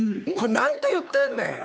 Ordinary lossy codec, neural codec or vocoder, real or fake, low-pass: none; codec, 16 kHz, 4 kbps, X-Codec, HuBERT features, trained on LibriSpeech; fake; none